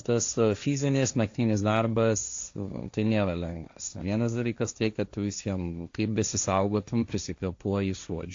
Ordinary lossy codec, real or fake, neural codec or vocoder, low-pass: AAC, 48 kbps; fake; codec, 16 kHz, 1.1 kbps, Voila-Tokenizer; 7.2 kHz